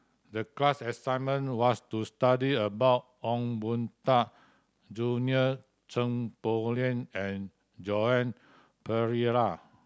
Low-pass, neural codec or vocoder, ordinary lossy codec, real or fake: none; none; none; real